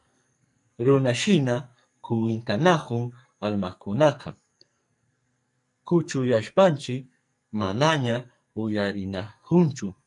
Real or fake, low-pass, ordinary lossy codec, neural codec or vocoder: fake; 10.8 kHz; MP3, 96 kbps; codec, 44.1 kHz, 2.6 kbps, SNAC